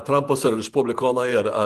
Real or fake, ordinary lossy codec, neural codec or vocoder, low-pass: fake; Opus, 24 kbps; vocoder, 48 kHz, 128 mel bands, Vocos; 14.4 kHz